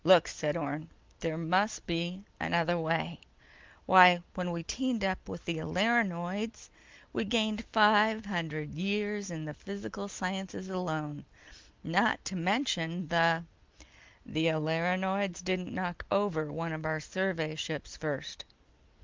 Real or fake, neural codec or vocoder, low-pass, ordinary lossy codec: real; none; 7.2 kHz; Opus, 24 kbps